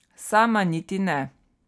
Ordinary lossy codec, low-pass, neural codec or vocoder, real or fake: none; none; none; real